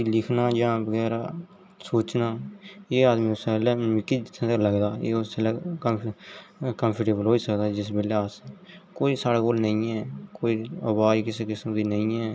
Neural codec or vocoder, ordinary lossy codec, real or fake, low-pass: none; none; real; none